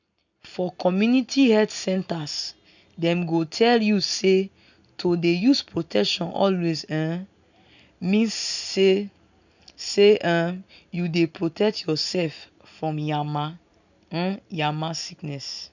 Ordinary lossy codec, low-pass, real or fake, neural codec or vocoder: none; 7.2 kHz; real; none